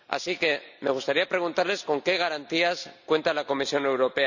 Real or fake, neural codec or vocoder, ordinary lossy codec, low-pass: real; none; none; 7.2 kHz